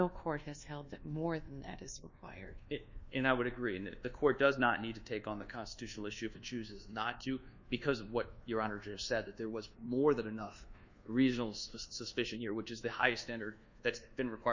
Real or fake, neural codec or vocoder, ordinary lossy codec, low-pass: fake; codec, 24 kHz, 1.2 kbps, DualCodec; Opus, 64 kbps; 7.2 kHz